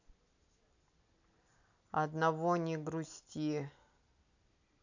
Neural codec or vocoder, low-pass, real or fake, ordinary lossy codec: none; 7.2 kHz; real; none